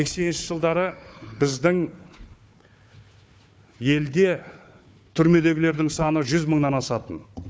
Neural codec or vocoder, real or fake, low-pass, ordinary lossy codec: codec, 16 kHz, 4 kbps, FunCodec, trained on Chinese and English, 50 frames a second; fake; none; none